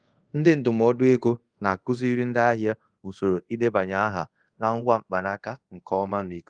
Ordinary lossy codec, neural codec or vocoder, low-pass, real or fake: Opus, 24 kbps; codec, 24 kHz, 0.5 kbps, DualCodec; 9.9 kHz; fake